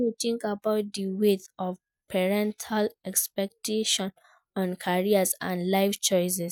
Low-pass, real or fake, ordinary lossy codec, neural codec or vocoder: none; real; none; none